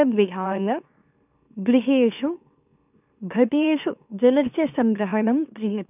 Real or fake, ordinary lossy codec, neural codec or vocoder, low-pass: fake; none; autoencoder, 44.1 kHz, a latent of 192 numbers a frame, MeloTTS; 3.6 kHz